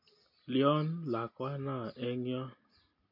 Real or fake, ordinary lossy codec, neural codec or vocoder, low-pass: real; MP3, 32 kbps; none; 5.4 kHz